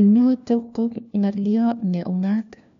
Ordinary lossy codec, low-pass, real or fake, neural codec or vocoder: none; 7.2 kHz; fake; codec, 16 kHz, 1 kbps, FunCodec, trained on LibriTTS, 50 frames a second